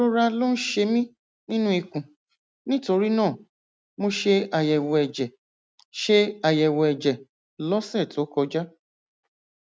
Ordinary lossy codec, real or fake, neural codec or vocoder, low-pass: none; real; none; none